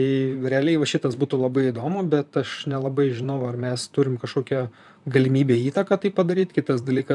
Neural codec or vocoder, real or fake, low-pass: vocoder, 44.1 kHz, 128 mel bands, Pupu-Vocoder; fake; 10.8 kHz